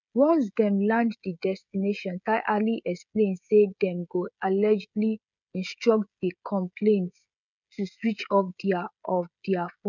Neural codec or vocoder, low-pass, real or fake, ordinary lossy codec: codec, 16 kHz, 16 kbps, FreqCodec, smaller model; 7.2 kHz; fake; none